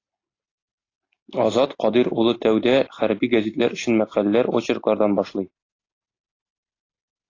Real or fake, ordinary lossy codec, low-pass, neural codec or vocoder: real; AAC, 32 kbps; 7.2 kHz; none